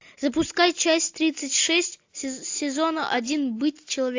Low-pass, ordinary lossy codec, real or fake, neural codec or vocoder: 7.2 kHz; AAC, 48 kbps; real; none